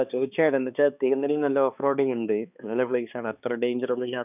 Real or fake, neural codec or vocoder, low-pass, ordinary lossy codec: fake; codec, 16 kHz, 2 kbps, X-Codec, HuBERT features, trained on balanced general audio; 3.6 kHz; none